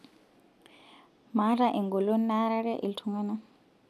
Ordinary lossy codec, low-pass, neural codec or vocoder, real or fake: none; 14.4 kHz; none; real